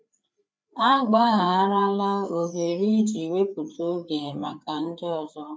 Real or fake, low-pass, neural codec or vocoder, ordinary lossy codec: fake; none; codec, 16 kHz, 4 kbps, FreqCodec, larger model; none